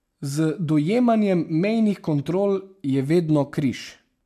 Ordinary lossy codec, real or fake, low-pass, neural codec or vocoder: MP3, 96 kbps; real; 14.4 kHz; none